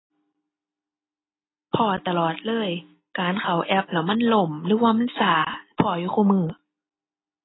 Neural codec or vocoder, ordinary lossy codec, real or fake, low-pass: none; AAC, 16 kbps; real; 7.2 kHz